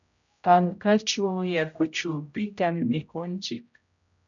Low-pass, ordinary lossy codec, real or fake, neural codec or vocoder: 7.2 kHz; MP3, 96 kbps; fake; codec, 16 kHz, 0.5 kbps, X-Codec, HuBERT features, trained on general audio